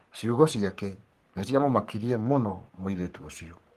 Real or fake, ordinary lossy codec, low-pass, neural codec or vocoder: fake; Opus, 24 kbps; 14.4 kHz; codec, 44.1 kHz, 3.4 kbps, Pupu-Codec